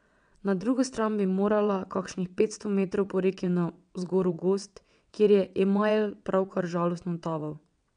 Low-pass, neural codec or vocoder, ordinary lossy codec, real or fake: 9.9 kHz; vocoder, 22.05 kHz, 80 mel bands, WaveNeXt; none; fake